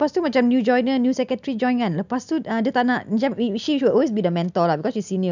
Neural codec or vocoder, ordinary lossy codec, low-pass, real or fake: none; none; 7.2 kHz; real